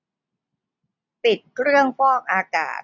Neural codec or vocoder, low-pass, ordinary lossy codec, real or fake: none; 7.2 kHz; none; real